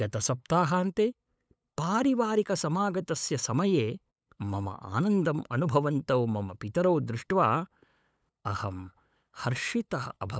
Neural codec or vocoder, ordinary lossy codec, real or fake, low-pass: codec, 16 kHz, 8 kbps, FunCodec, trained on LibriTTS, 25 frames a second; none; fake; none